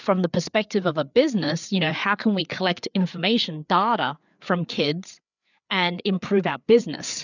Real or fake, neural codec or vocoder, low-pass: fake; codec, 16 kHz, 4 kbps, FreqCodec, larger model; 7.2 kHz